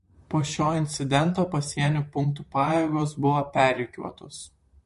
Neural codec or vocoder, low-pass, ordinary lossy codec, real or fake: vocoder, 44.1 kHz, 128 mel bands, Pupu-Vocoder; 14.4 kHz; MP3, 48 kbps; fake